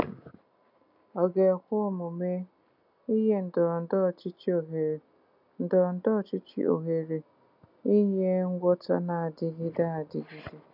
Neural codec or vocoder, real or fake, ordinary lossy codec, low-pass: none; real; none; 5.4 kHz